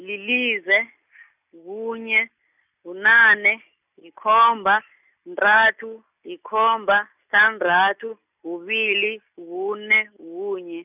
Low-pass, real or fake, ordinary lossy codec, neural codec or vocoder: 3.6 kHz; real; none; none